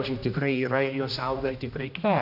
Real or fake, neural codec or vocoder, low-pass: fake; codec, 16 kHz, 1 kbps, X-Codec, HuBERT features, trained on general audio; 5.4 kHz